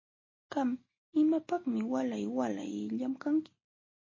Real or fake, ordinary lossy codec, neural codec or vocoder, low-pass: real; MP3, 32 kbps; none; 7.2 kHz